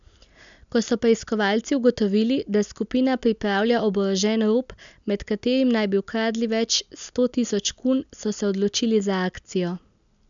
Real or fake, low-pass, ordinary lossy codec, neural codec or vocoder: real; 7.2 kHz; MP3, 96 kbps; none